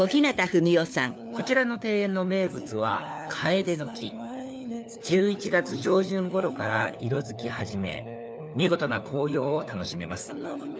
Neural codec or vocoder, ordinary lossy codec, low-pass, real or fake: codec, 16 kHz, 4 kbps, FunCodec, trained on LibriTTS, 50 frames a second; none; none; fake